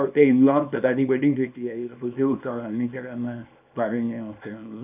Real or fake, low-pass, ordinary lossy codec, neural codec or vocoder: fake; 3.6 kHz; none; codec, 24 kHz, 0.9 kbps, WavTokenizer, small release